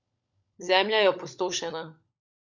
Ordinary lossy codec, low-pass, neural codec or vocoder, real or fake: none; 7.2 kHz; codec, 16 kHz, 16 kbps, FunCodec, trained on LibriTTS, 50 frames a second; fake